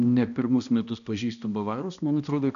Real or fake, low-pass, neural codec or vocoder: fake; 7.2 kHz; codec, 16 kHz, 1 kbps, X-Codec, HuBERT features, trained on balanced general audio